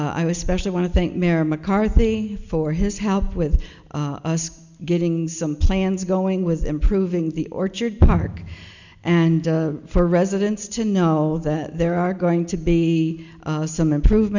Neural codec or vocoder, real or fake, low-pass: none; real; 7.2 kHz